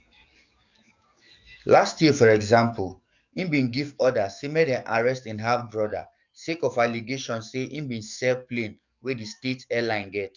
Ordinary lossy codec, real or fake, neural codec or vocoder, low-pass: none; fake; codec, 44.1 kHz, 7.8 kbps, DAC; 7.2 kHz